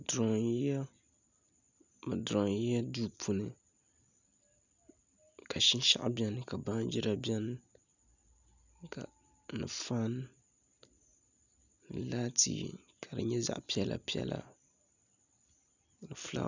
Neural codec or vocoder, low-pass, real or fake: none; 7.2 kHz; real